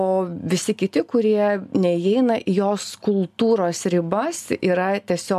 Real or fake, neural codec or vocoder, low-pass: real; none; 14.4 kHz